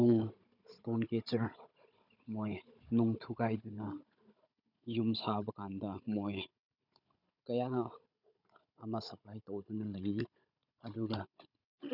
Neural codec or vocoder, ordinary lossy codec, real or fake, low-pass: codec, 16 kHz, 16 kbps, FunCodec, trained on Chinese and English, 50 frames a second; none; fake; 5.4 kHz